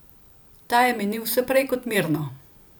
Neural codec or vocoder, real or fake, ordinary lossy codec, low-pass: vocoder, 44.1 kHz, 128 mel bands every 512 samples, BigVGAN v2; fake; none; none